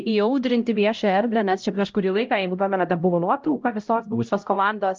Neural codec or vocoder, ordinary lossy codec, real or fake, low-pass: codec, 16 kHz, 0.5 kbps, X-Codec, HuBERT features, trained on LibriSpeech; Opus, 24 kbps; fake; 7.2 kHz